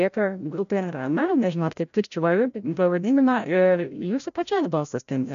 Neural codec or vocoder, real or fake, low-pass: codec, 16 kHz, 0.5 kbps, FreqCodec, larger model; fake; 7.2 kHz